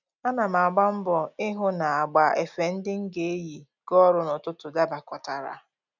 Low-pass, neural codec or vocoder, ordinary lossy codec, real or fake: 7.2 kHz; none; none; real